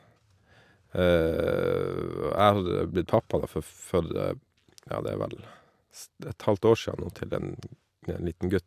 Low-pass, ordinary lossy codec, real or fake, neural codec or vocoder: 14.4 kHz; AAC, 96 kbps; real; none